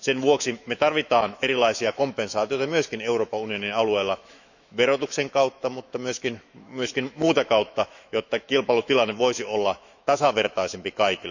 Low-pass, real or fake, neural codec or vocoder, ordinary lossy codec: 7.2 kHz; fake; autoencoder, 48 kHz, 128 numbers a frame, DAC-VAE, trained on Japanese speech; none